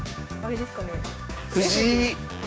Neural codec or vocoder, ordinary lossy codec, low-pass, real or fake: codec, 16 kHz, 6 kbps, DAC; none; none; fake